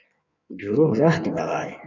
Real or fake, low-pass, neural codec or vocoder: fake; 7.2 kHz; codec, 16 kHz in and 24 kHz out, 1.1 kbps, FireRedTTS-2 codec